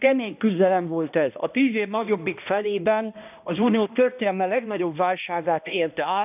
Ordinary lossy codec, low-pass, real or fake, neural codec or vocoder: none; 3.6 kHz; fake; codec, 16 kHz, 1 kbps, X-Codec, HuBERT features, trained on balanced general audio